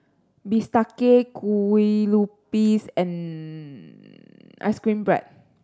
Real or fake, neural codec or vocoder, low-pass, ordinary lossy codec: real; none; none; none